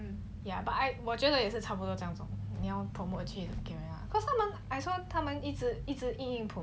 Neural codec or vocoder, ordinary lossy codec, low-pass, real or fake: none; none; none; real